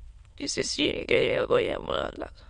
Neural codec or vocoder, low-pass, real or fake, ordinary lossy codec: autoencoder, 22.05 kHz, a latent of 192 numbers a frame, VITS, trained on many speakers; 9.9 kHz; fake; MP3, 64 kbps